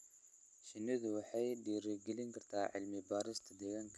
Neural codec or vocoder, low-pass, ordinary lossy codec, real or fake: none; none; none; real